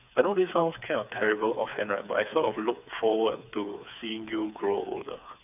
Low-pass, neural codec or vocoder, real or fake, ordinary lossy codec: 3.6 kHz; codec, 16 kHz, 4 kbps, FreqCodec, smaller model; fake; none